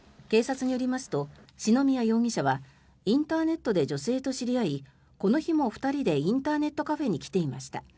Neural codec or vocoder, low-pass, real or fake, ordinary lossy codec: none; none; real; none